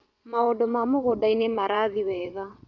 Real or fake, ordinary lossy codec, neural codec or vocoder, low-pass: fake; none; vocoder, 22.05 kHz, 80 mel bands, WaveNeXt; 7.2 kHz